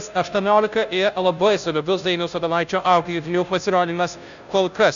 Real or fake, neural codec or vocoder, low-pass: fake; codec, 16 kHz, 0.5 kbps, FunCodec, trained on Chinese and English, 25 frames a second; 7.2 kHz